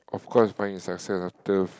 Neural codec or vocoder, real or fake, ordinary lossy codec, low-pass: none; real; none; none